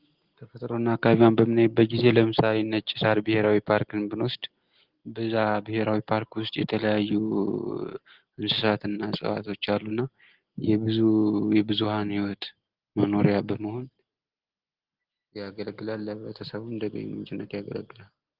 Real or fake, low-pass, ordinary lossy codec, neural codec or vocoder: real; 5.4 kHz; Opus, 16 kbps; none